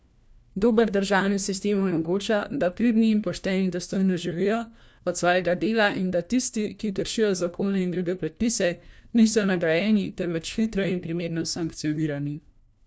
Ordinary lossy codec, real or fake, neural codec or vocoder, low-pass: none; fake; codec, 16 kHz, 1 kbps, FunCodec, trained on LibriTTS, 50 frames a second; none